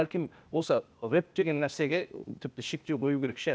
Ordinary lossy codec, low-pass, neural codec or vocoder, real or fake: none; none; codec, 16 kHz, 0.8 kbps, ZipCodec; fake